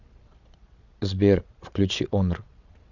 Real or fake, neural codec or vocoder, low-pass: fake; vocoder, 22.05 kHz, 80 mel bands, Vocos; 7.2 kHz